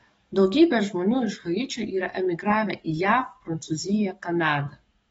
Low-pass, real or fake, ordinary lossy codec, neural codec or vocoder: 19.8 kHz; fake; AAC, 24 kbps; codec, 44.1 kHz, 7.8 kbps, DAC